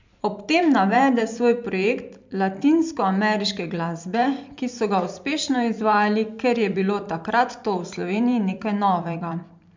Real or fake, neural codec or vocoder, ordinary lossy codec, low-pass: fake; vocoder, 44.1 kHz, 128 mel bands every 512 samples, BigVGAN v2; MP3, 64 kbps; 7.2 kHz